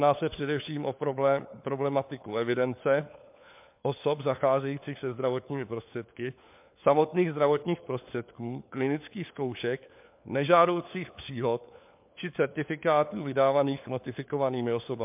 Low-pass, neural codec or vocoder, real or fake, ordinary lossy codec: 3.6 kHz; codec, 16 kHz, 4 kbps, FunCodec, trained on LibriTTS, 50 frames a second; fake; MP3, 32 kbps